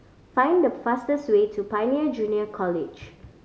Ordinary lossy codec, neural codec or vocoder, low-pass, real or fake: none; none; none; real